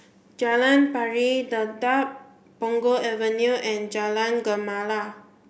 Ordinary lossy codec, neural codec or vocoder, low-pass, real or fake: none; none; none; real